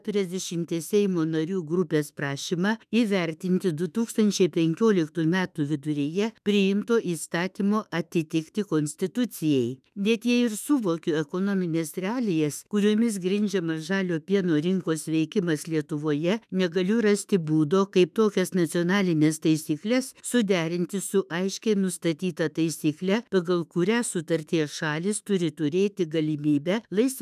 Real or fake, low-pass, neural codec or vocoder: fake; 14.4 kHz; autoencoder, 48 kHz, 32 numbers a frame, DAC-VAE, trained on Japanese speech